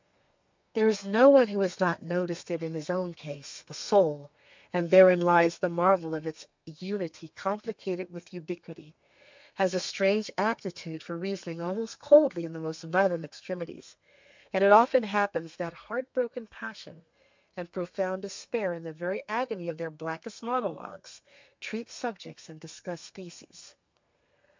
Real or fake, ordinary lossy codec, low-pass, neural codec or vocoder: fake; MP3, 64 kbps; 7.2 kHz; codec, 32 kHz, 1.9 kbps, SNAC